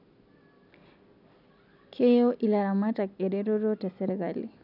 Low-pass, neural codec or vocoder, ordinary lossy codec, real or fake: 5.4 kHz; none; none; real